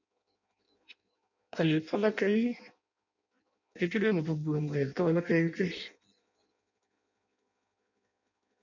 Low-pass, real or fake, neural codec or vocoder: 7.2 kHz; fake; codec, 16 kHz in and 24 kHz out, 0.6 kbps, FireRedTTS-2 codec